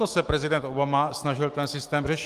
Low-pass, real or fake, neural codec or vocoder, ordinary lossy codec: 14.4 kHz; fake; autoencoder, 48 kHz, 128 numbers a frame, DAC-VAE, trained on Japanese speech; Opus, 24 kbps